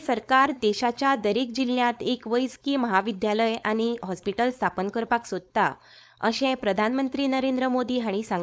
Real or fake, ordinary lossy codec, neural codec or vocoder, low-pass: fake; none; codec, 16 kHz, 4.8 kbps, FACodec; none